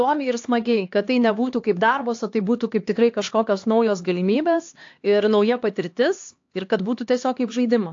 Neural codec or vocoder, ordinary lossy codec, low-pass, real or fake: codec, 16 kHz, 2 kbps, X-Codec, HuBERT features, trained on LibriSpeech; AAC, 48 kbps; 7.2 kHz; fake